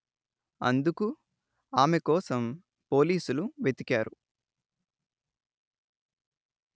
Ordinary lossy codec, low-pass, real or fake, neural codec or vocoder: none; none; real; none